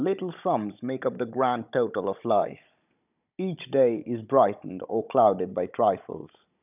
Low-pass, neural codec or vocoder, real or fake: 3.6 kHz; codec, 16 kHz, 16 kbps, FunCodec, trained on Chinese and English, 50 frames a second; fake